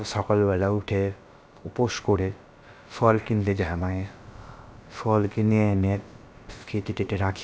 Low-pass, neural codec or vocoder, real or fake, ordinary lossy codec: none; codec, 16 kHz, about 1 kbps, DyCAST, with the encoder's durations; fake; none